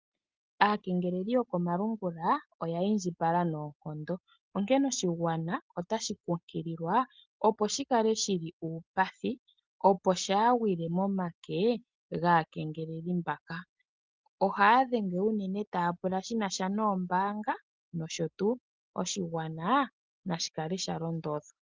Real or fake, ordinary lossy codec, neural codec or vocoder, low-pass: real; Opus, 32 kbps; none; 7.2 kHz